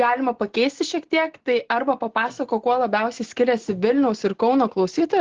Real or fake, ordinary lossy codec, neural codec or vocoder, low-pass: real; Opus, 16 kbps; none; 7.2 kHz